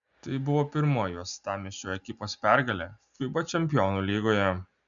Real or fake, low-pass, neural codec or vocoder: real; 7.2 kHz; none